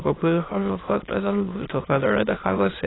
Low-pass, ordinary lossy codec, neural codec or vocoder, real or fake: 7.2 kHz; AAC, 16 kbps; autoencoder, 22.05 kHz, a latent of 192 numbers a frame, VITS, trained on many speakers; fake